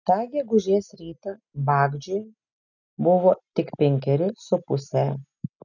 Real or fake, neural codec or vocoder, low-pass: real; none; 7.2 kHz